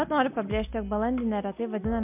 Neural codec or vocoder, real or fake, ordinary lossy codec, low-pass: none; real; MP3, 32 kbps; 3.6 kHz